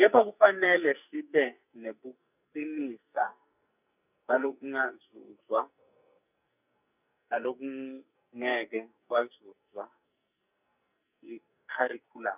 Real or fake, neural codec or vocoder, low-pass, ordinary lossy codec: fake; codec, 44.1 kHz, 2.6 kbps, SNAC; 3.6 kHz; none